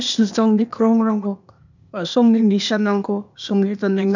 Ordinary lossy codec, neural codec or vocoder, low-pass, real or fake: none; codec, 16 kHz, 0.8 kbps, ZipCodec; 7.2 kHz; fake